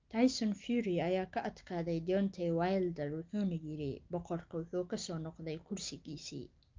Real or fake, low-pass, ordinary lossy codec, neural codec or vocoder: real; 7.2 kHz; Opus, 24 kbps; none